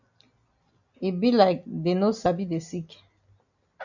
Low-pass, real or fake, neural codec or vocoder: 7.2 kHz; real; none